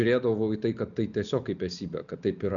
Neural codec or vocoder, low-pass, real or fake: none; 7.2 kHz; real